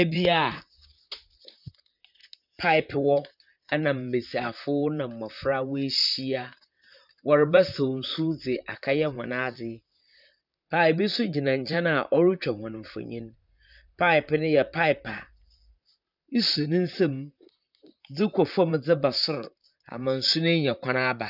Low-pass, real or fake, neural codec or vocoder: 5.4 kHz; fake; vocoder, 44.1 kHz, 128 mel bands, Pupu-Vocoder